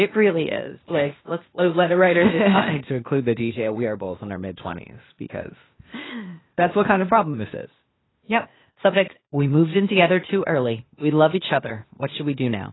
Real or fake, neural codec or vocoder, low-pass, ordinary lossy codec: fake; codec, 16 kHz, 0.8 kbps, ZipCodec; 7.2 kHz; AAC, 16 kbps